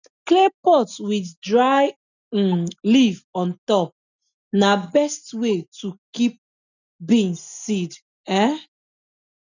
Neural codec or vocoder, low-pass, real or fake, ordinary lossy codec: none; 7.2 kHz; real; none